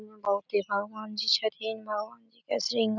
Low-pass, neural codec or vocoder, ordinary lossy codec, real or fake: 7.2 kHz; none; none; real